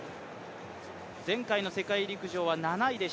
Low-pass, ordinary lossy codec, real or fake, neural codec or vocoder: none; none; real; none